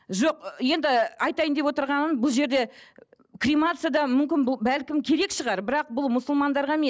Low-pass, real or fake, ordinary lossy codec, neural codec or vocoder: none; real; none; none